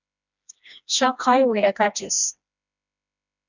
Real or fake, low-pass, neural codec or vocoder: fake; 7.2 kHz; codec, 16 kHz, 1 kbps, FreqCodec, smaller model